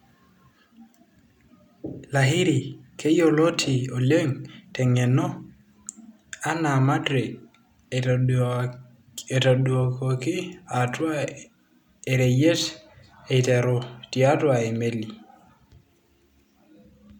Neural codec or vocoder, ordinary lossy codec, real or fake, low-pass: none; none; real; 19.8 kHz